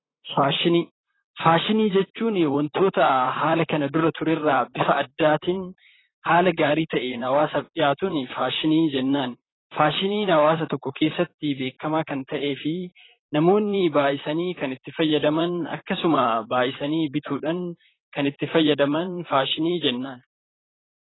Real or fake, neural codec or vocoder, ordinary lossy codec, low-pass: fake; vocoder, 44.1 kHz, 128 mel bands, Pupu-Vocoder; AAC, 16 kbps; 7.2 kHz